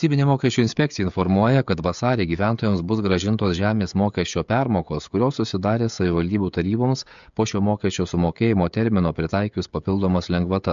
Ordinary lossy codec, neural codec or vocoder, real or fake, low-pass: MP3, 64 kbps; codec, 16 kHz, 16 kbps, FreqCodec, smaller model; fake; 7.2 kHz